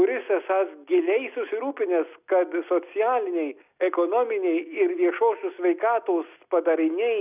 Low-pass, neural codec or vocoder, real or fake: 3.6 kHz; none; real